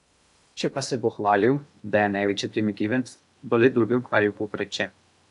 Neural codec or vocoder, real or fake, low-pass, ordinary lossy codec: codec, 16 kHz in and 24 kHz out, 0.6 kbps, FocalCodec, streaming, 2048 codes; fake; 10.8 kHz; MP3, 96 kbps